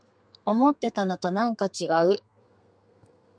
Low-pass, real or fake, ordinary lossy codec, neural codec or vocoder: 9.9 kHz; fake; MP3, 96 kbps; codec, 32 kHz, 1.9 kbps, SNAC